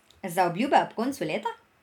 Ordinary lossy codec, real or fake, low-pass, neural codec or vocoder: none; real; 19.8 kHz; none